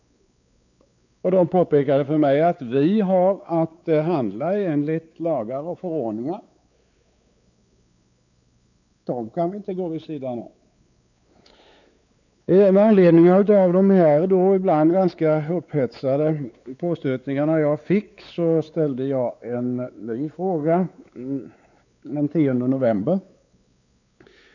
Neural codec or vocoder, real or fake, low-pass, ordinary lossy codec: codec, 16 kHz, 4 kbps, X-Codec, WavLM features, trained on Multilingual LibriSpeech; fake; 7.2 kHz; none